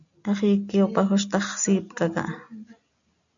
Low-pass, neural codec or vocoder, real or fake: 7.2 kHz; none; real